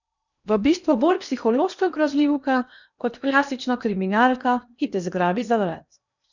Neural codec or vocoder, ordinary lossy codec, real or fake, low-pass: codec, 16 kHz in and 24 kHz out, 0.8 kbps, FocalCodec, streaming, 65536 codes; none; fake; 7.2 kHz